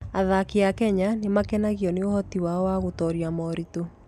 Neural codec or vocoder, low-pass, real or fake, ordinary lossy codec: none; 14.4 kHz; real; none